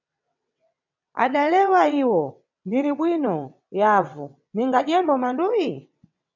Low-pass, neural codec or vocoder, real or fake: 7.2 kHz; vocoder, 22.05 kHz, 80 mel bands, WaveNeXt; fake